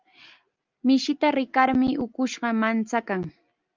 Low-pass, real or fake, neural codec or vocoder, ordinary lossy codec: 7.2 kHz; real; none; Opus, 24 kbps